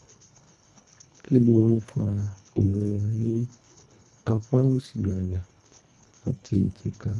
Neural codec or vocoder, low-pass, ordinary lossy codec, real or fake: codec, 24 kHz, 1.5 kbps, HILCodec; none; none; fake